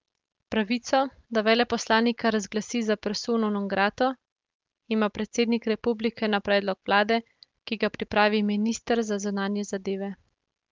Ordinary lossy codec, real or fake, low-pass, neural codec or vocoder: Opus, 24 kbps; real; 7.2 kHz; none